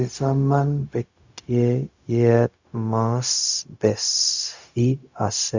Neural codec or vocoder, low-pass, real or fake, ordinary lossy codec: codec, 16 kHz, 0.4 kbps, LongCat-Audio-Codec; 7.2 kHz; fake; Opus, 64 kbps